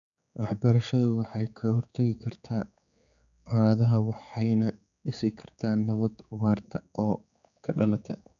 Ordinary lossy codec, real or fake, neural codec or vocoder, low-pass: none; fake; codec, 16 kHz, 4 kbps, X-Codec, HuBERT features, trained on general audio; 7.2 kHz